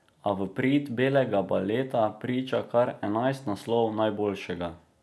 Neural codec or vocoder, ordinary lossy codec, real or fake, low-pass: none; none; real; none